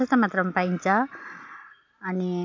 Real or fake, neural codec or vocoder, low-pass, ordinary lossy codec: real; none; 7.2 kHz; AAC, 48 kbps